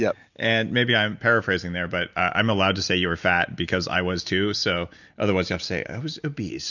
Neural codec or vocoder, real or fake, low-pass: none; real; 7.2 kHz